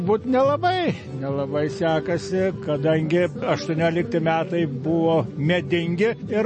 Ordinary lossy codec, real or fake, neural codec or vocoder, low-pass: MP3, 32 kbps; real; none; 9.9 kHz